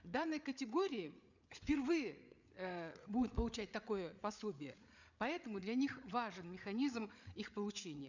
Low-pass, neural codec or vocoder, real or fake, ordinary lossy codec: 7.2 kHz; codec, 16 kHz, 8 kbps, FreqCodec, larger model; fake; none